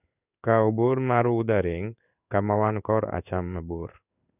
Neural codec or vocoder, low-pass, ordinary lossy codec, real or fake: codec, 16 kHz in and 24 kHz out, 1 kbps, XY-Tokenizer; 3.6 kHz; none; fake